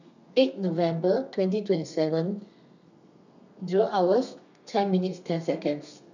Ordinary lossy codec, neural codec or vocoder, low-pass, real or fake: none; codec, 32 kHz, 1.9 kbps, SNAC; 7.2 kHz; fake